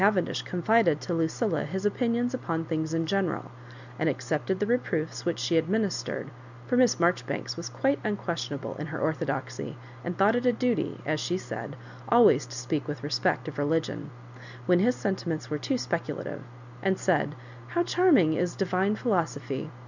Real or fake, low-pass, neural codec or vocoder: real; 7.2 kHz; none